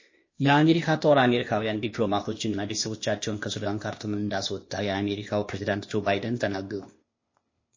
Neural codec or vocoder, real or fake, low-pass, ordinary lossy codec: codec, 16 kHz, 0.8 kbps, ZipCodec; fake; 7.2 kHz; MP3, 32 kbps